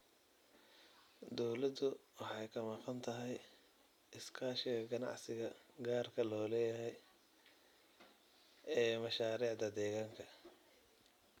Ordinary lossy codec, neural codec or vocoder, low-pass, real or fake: none; none; 19.8 kHz; real